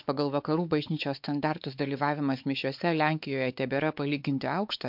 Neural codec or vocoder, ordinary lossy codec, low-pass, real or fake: codec, 16 kHz, 4 kbps, X-Codec, WavLM features, trained on Multilingual LibriSpeech; MP3, 48 kbps; 5.4 kHz; fake